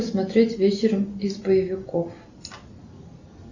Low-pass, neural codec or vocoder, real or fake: 7.2 kHz; none; real